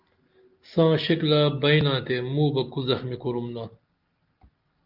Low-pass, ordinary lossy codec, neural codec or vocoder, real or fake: 5.4 kHz; Opus, 24 kbps; none; real